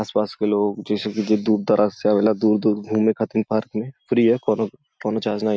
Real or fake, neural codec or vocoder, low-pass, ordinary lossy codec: real; none; none; none